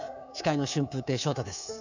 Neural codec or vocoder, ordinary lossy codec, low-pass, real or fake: codec, 24 kHz, 3.1 kbps, DualCodec; none; 7.2 kHz; fake